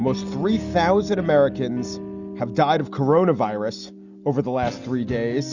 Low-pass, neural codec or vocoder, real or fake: 7.2 kHz; none; real